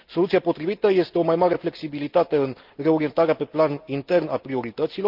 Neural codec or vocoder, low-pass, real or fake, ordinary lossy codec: none; 5.4 kHz; real; Opus, 32 kbps